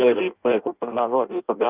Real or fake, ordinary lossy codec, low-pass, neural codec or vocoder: fake; Opus, 24 kbps; 3.6 kHz; codec, 16 kHz in and 24 kHz out, 0.6 kbps, FireRedTTS-2 codec